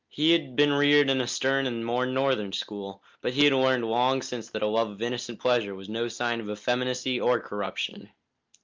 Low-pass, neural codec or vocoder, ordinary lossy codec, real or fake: 7.2 kHz; none; Opus, 24 kbps; real